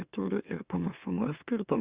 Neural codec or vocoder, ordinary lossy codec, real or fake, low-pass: autoencoder, 44.1 kHz, a latent of 192 numbers a frame, MeloTTS; Opus, 64 kbps; fake; 3.6 kHz